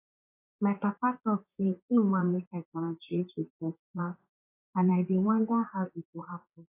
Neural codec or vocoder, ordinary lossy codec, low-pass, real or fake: vocoder, 44.1 kHz, 128 mel bands, Pupu-Vocoder; AAC, 24 kbps; 3.6 kHz; fake